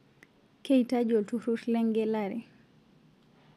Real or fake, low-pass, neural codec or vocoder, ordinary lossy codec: real; 14.4 kHz; none; none